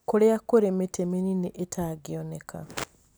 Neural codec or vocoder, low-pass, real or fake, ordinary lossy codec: none; none; real; none